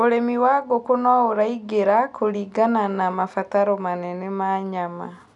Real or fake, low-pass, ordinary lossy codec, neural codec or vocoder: real; 10.8 kHz; none; none